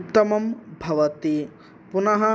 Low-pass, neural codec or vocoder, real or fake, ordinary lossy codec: none; none; real; none